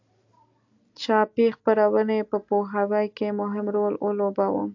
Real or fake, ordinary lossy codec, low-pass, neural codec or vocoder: real; AAC, 48 kbps; 7.2 kHz; none